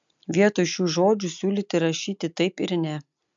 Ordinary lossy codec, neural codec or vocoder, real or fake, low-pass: MP3, 64 kbps; none; real; 7.2 kHz